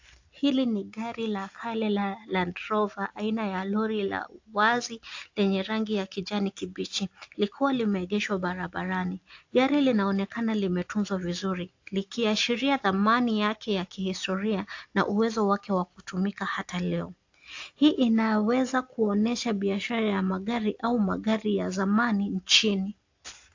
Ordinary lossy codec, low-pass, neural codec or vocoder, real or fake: AAC, 48 kbps; 7.2 kHz; none; real